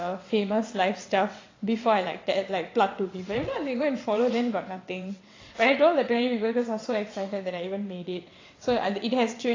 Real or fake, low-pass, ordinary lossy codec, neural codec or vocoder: fake; 7.2 kHz; AAC, 32 kbps; vocoder, 22.05 kHz, 80 mel bands, WaveNeXt